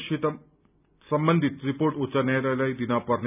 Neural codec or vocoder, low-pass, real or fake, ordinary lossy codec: none; 3.6 kHz; real; none